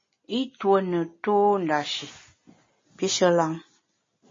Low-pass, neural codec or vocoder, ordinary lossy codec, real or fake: 7.2 kHz; none; MP3, 32 kbps; real